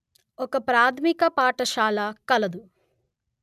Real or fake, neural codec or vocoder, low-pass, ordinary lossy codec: real; none; 14.4 kHz; none